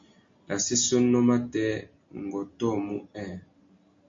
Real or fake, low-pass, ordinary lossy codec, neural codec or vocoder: real; 7.2 kHz; MP3, 48 kbps; none